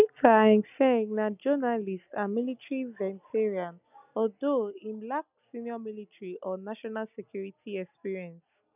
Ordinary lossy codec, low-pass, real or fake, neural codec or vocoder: none; 3.6 kHz; real; none